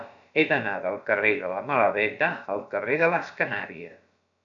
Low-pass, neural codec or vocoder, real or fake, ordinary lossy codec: 7.2 kHz; codec, 16 kHz, about 1 kbps, DyCAST, with the encoder's durations; fake; MP3, 96 kbps